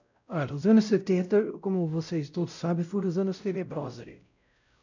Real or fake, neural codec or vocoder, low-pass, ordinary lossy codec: fake; codec, 16 kHz, 0.5 kbps, X-Codec, WavLM features, trained on Multilingual LibriSpeech; 7.2 kHz; none